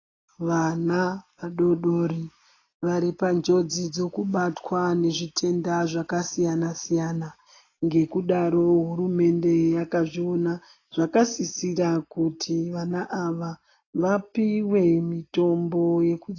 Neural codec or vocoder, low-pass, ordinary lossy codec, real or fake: none; 7.2 kHz; AAC, 32 kbps; real